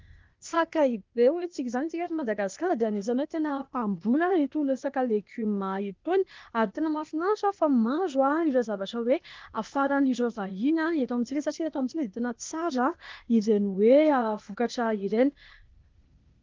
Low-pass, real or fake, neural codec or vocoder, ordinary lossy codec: 7.2 kHz; fake; codec, 16 kHz, 0.8 kbps, ZipCodec; Opus, 32 kbps